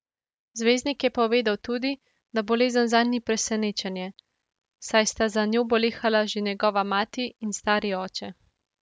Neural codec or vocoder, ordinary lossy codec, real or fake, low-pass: none; none; real; none